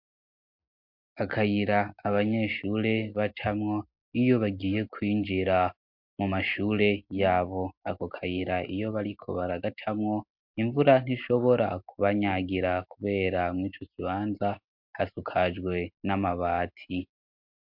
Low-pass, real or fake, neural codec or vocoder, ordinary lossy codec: 5.4 kHz; real; none; MP3, 48 kbps